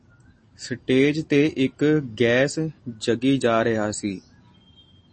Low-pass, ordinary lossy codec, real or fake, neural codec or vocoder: 10.8 kHz; MP3, 32 kbps; real; none